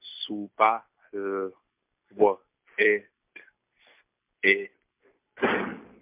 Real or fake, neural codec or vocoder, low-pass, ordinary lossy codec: real; none; 3.6 kHz; MP3, 32 kbps